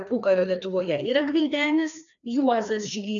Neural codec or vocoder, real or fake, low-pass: codec, 16 kHz, 2 kbps, FreqCodec, larger model; fake; 7.2 kHz